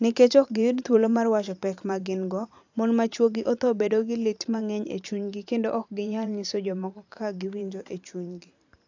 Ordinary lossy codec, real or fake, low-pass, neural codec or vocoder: AAC, 48 kbps; fake; 7.2 kHz; vocoder, 44.1 kHz, 128 mel bands every 512 samples, BigVGAN v2